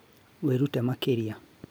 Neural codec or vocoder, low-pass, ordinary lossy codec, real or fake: none; none; none; real